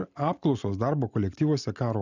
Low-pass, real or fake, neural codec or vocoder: 7.2 kHz; real; none